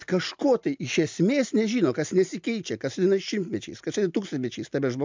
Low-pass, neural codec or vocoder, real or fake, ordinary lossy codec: 7.2 kHz; none; real; MP3, 64 kbps